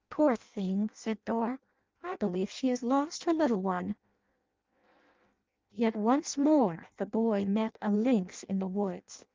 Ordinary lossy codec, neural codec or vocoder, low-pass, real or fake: Opus, 24 kbps; codec, 16 kHz in and 24 kHz out, 0.6 kbps, FireRedTTS-2 codec; 7.2 kHz; fake